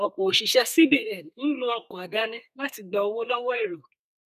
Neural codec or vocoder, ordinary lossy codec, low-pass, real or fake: codec, 32 kHz, 1.9 kbps, SNAC; none; 14.4 kHz; fake